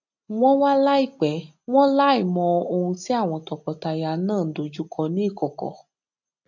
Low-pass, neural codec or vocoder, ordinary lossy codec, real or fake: 7.2 kHz; none; none; real